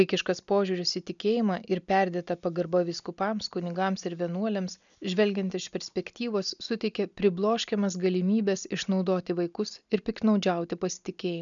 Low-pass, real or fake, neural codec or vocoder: 7.2 kHz; real; none